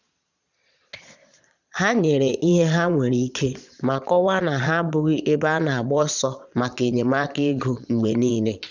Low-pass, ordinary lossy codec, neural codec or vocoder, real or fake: 7.2 kHz; none; vocoder, 22.05 kHz, 80 mel bands, Vocos; fake